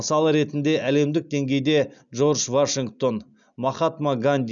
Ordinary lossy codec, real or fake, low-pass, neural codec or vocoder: none; real; 7.2 kHz; none